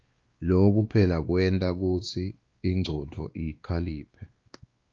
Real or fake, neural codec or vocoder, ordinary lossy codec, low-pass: fake; codec, 16 kHz, 2 kbps, X-Codec, WavLM features, trained on Multilingual LibriSpeech; Opus, 32 kbps; 7.2 kHz